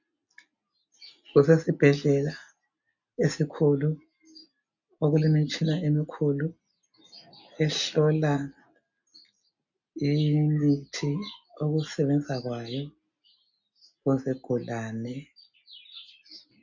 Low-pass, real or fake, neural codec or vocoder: 7.2 kHz; real; none